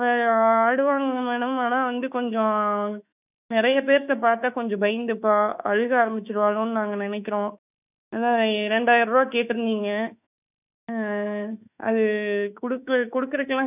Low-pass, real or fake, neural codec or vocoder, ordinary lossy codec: 3.6 kHz; fake; autoencoder, 48 kHz, 32 numbers a frame, DAC-VAE, trained on Japanese speech; none